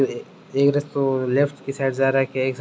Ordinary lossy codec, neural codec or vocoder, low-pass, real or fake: none; none; none; real